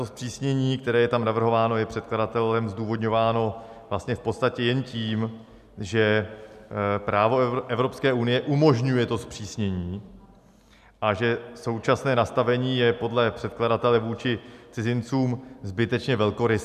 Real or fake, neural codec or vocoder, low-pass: real; none; 14.4 kHz